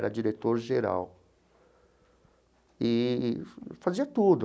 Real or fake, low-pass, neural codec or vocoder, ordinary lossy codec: real; none; none; none